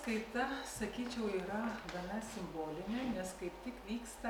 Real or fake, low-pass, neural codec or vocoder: real; 19.8 kHz; none